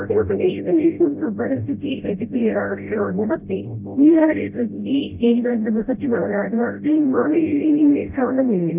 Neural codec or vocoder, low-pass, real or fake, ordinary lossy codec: codec, 16 kHz, 0.5 kbps, FreqCodec, smaller model; 3.6 kHz; fake; none